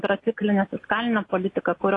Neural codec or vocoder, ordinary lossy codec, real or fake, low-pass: none; AAC, 32 kbps; real; 10.8 kHz